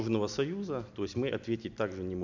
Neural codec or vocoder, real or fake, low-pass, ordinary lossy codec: none; real; 7.2 kHz; none